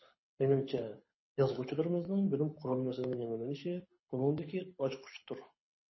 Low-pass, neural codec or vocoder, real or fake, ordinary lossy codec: 7.2 kHz; codec, 24 kHz, 6 kbps, HILCodec; fake; MP3, 24 kbps